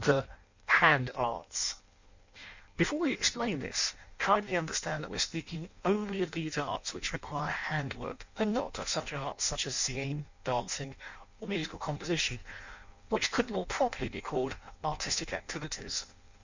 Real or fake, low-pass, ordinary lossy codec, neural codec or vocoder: fake; 7.2 kHz; Opus, 64 kbps; codec, 16 kHz in and 24 kHz out, 0.6 kbps, FireRedTTS-2 codec